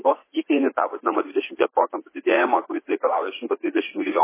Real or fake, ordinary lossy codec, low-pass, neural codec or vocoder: fake; MP3, 16 kbps; 3.6 kHz; vocoder, 44.1 kHz, 80 mel bands, Vocos